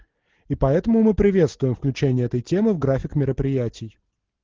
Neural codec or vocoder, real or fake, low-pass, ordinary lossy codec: none; real; 7.2 kHz; Opus, 16 kbps